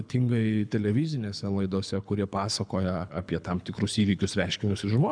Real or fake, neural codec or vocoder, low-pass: fake; codec, 24 kHz, 6 kbps, HILCodec; 9.9 kHz